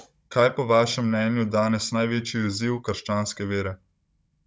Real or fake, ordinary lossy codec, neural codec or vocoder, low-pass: fake; none; codec, 16 kHz, 16 kbps, FunCodec, trained on Chinese and English, 50 frames a second; none